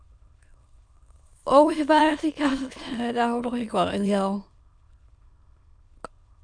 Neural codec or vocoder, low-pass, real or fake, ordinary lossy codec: autoencoder, 22.05 kHz, a latent of 192 numbers a frame, VITS, trained on many speakers; 9.9 kHz; fake; Opus, 64 kbps